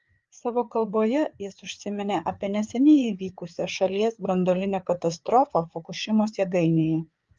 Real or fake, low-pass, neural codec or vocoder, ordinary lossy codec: fake; 7.2 kHz; codec, 16 kHz, 4 kbps, FreqCodec, larger model; Opus, 32 kbps